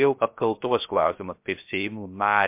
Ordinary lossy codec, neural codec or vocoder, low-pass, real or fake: MP3, 32 kbps; codec, 16 kHz, 0.3 kbps, FocalCodec; 3.6 kHz; fake